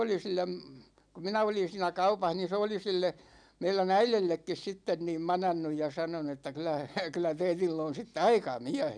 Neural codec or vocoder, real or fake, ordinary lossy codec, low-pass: none; real; none; 9.9 kHz